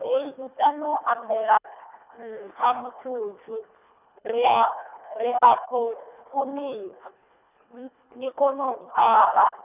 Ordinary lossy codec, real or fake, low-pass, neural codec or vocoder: none; fake; 3.6 kHz; codec, 24 kHz, 1.5 kbps, HILCodec